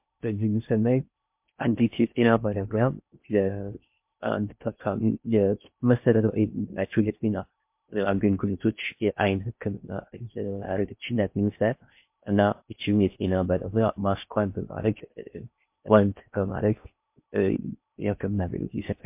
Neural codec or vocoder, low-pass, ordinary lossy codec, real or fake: codec, 16 kHz in and 24 kHz out, 0.6 kbps, FocalCodec, streaming, 2048 codes; 3.6 kHz; MP3, 32 kbps; fake